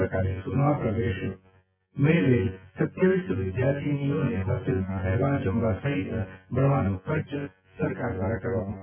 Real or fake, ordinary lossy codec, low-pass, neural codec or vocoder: fake; none; 3.6 kHz; vocoder, 24 kHz, 100 mel bands, Vocos